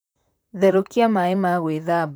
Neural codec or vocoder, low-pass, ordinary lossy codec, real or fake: vocoder, 44.1 kHz, 128 mel bands, Pupu-Vocoder; none; none; fake